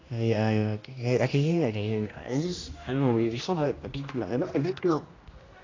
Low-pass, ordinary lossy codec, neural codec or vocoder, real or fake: 7.2 kHz; AAC, 32 kbps; codec, 16 kHz, 1 kbps, X-Codec, HuBERT features, trained on general audio; fake